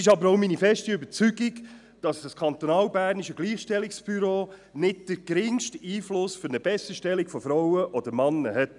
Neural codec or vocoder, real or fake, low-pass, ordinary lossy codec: none; real; 10.8 kHz; none